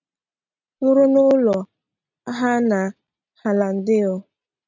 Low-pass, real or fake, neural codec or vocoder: 7.2 kHz; real; none